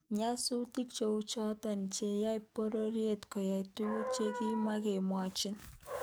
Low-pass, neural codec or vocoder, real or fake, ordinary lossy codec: none; codec, 44.1 kHz, 7.8 kbps, DAC; fake; none